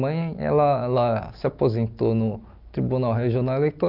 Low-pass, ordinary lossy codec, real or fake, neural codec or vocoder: 5.4 kHz; Opus, 24 kbps; real; none